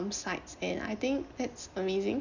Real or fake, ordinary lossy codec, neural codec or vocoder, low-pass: real; none; none; 7.2 kHz